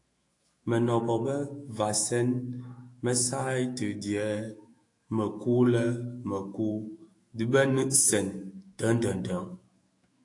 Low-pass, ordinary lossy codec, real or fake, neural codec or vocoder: 10.8 kHz; AAC, 48 kbps; fake; autoencoder, 48 kHz, 128 numbers a frame, DAC-VAE, trained on Japanese speech